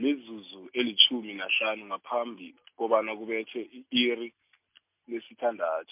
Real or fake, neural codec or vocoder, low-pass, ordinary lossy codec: real; none; 3.6 kHz; MP3, 32 kbps